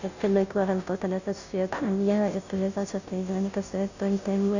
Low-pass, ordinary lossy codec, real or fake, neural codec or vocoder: 7.2 kHz; none; fake; codec, 16 kHz, 0.5 kbps, FunCodec, trained on Chinese and English, 25 frames a second